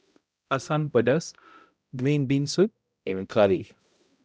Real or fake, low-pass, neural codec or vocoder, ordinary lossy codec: fake; none; codec, 16 kHz, 0.5 kbps, X-Codec, HuBERT features, trained on balanced general audio; none